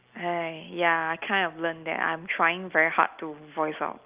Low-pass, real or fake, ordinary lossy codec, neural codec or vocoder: 3.6 kHz; real; Opus, 32 kbps; none